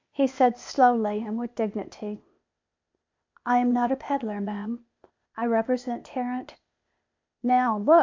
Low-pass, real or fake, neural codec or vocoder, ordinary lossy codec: 7.2 kHz; fake; codec, 16 kHz, 0.8 kbps, ZipCodec; MP3, 48 kbps